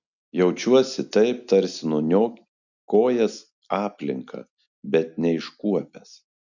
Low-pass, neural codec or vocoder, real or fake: 7.2 kHz; none; real